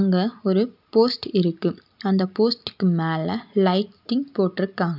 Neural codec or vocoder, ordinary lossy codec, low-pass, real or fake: none; none; 5.4 kHz; real